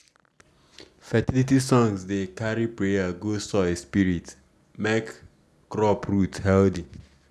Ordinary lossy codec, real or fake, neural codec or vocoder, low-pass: none; real; none; none